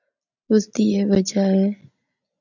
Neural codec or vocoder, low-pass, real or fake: none; 7.2 kHz; real